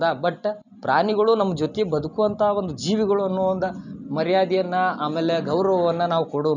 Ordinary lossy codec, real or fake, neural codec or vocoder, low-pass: none; real; none; 7.2 kHz